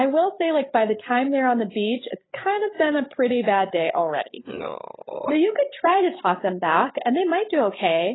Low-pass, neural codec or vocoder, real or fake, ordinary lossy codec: 7.2 kHz; codec, 16 kHz, 8 kbps, FreqCodec, larger model; fake; AAC, 16 kbps